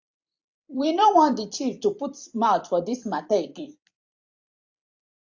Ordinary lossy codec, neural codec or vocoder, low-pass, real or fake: AAC, 48 kbps; vocoder, 44.1 kHz, 128 mel bands every 256 samples, BigVGAN v2; 7.2 kHz; fake